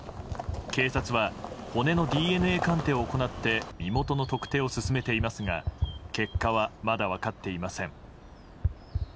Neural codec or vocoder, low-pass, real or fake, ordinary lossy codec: none; none; real; none